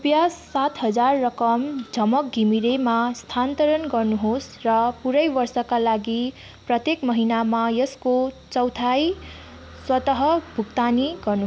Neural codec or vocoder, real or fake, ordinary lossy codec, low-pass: none; real; none; none